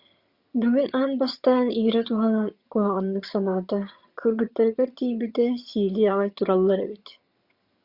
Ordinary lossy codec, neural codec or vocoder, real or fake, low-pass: Opus, 64 kbps; vocoder, 22.05 kHz, 80 mel bands, HiFi-GAN; fake; 5.4 kHz